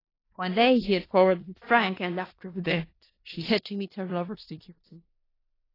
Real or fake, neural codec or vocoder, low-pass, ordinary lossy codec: fake; codec, 16 kHz in and 24 kHz out, 0.4 kbps, LongCat-Audio-Codec, four codebook decoder; 5.4 kHz; AAC, 24 kbps